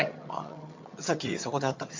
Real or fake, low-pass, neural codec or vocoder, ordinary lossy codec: fake; 7.2 kHz; vocoder, 22.05 kHz, 80 mel bands, HiFi-GAN; MP3, 48 kbps